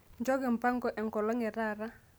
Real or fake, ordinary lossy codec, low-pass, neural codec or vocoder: real; none; none; none